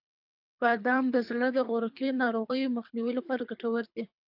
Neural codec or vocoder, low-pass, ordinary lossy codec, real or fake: codec, 24 kHz, 3 kbps, HILCodec; 5.4 kHz; AAC, 48 kbps; fake